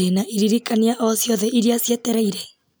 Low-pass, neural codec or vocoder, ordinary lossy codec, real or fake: none; none; none; real